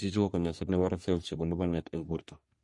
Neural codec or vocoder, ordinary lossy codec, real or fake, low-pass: codec, 44.1 kHz, 3.4 kbps, Pupu-Codec; MP3, 48 kbps; fake; 10.8 kHz